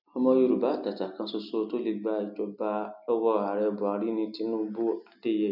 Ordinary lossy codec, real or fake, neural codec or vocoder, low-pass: none; real; none; 5.4 kHz